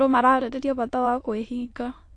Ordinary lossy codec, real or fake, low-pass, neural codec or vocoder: AAC, 48 kbps; fake; 9.9 kHz; autoencoder, 22.05 kHz, a latent of 192 numbers a frame, VITS, trained on many speakers